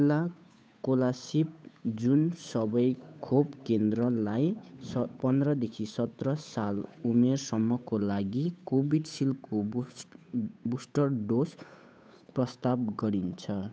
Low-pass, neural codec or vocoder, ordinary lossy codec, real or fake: none; codec, 16 kHz, 8 kbps, FunCodec, trained on Chinese and English, 25 frames a second; none; fake